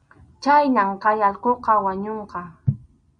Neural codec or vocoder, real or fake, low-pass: none; real; 9.9 kHz